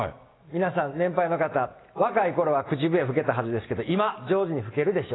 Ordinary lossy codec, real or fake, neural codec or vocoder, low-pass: AAC, 16 kbps; real; none; 7.2 kHz